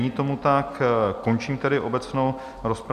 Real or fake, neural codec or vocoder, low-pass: real; none; 14.4 kHz